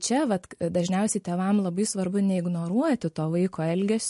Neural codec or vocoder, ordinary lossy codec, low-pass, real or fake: none; MP3, 48 kbps; 14.4 kHz; real